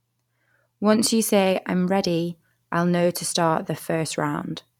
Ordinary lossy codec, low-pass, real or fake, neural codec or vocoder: none; 19.8 kHz; fake; vocoder, 44.1 kHz, 128 mel bands every 512 samples, BigVGAN v2